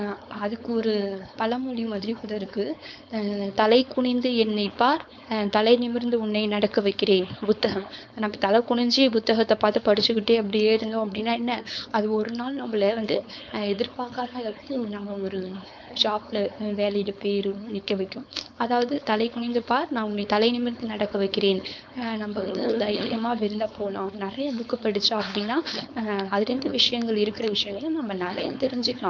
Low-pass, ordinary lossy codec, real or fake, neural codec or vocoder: none; none; fake; codec, 16 kHz, 4.8 kbps, FACodec